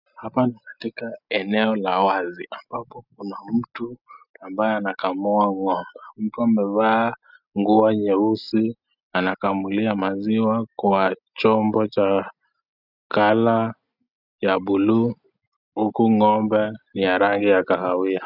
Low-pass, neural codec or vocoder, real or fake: 5.4 kHz; none; real